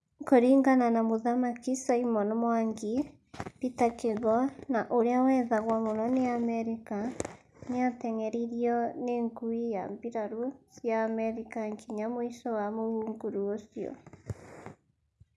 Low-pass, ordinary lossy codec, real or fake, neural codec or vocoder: none; none; real; none